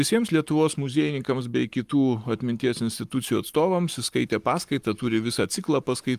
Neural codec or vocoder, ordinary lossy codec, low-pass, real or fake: codec, 44.1 kHz, 7.8 kbps, Pupu-Codec; Opus, 32 kbps; 14.4 kHz; fake